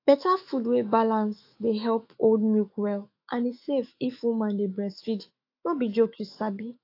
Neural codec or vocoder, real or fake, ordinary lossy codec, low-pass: autoencoder, 48 kHz, 128 numbers a frame, DAC-VAE, trained on Japanese speech; fake; AAC, 32 kbps; 5.4 kHz